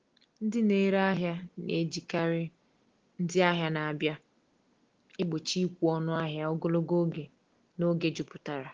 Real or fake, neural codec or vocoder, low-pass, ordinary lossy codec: real; none; 7.2 kHz; Opus, 16 kbps